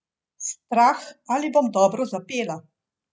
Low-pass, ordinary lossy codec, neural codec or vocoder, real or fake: none; none; none; real